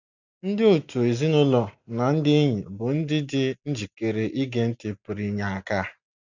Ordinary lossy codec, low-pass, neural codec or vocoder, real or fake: none; 7.2 kHz; none; real